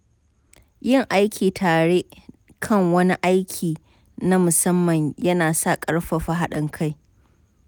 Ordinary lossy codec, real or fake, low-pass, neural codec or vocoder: none; real; none; none